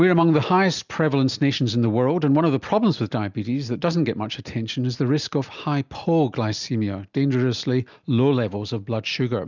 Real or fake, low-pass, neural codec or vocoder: real; 7.2 kHz; none